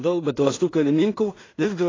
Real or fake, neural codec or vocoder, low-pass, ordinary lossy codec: fake; codec, 16 kHz in and 24 kHz out, 0.4 kbps, LongCat-Audio-Codec, two codebook decoder; 7.2 kHz; AAC, 32 kbps